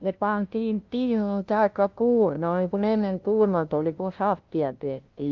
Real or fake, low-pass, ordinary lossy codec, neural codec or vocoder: fake; 7.2 kHz; Opus, 32 kbps; codec, 16 kHz, 0.5 kbps, FunCodec, trained on LibriTTS, 25 frames a second